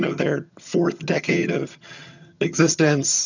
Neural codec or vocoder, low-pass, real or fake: vocoder, 22.05 kHz, 80 mel bands, HiFi-GAN; 7.2 kHz; fake